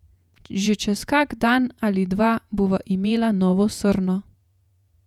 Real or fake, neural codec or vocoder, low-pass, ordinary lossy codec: fake; vocoder, 48 kHz, 128 mel bands, Vocos; 19.8 kHz; none